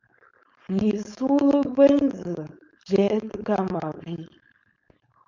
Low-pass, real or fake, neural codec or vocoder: 7.2 kHz; fake; codec, 16 kHz, 4.8 kbps, FACodec